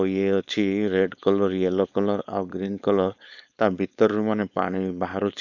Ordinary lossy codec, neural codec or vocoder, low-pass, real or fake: none; codec, 16 kHz, 4.8 kbps, FACodec; 7.2 kHz; fake